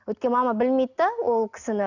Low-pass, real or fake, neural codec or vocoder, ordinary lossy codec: 7.2 kHz; real; none; none